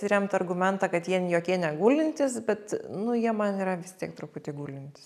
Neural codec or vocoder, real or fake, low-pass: none; real; 14.4 kHz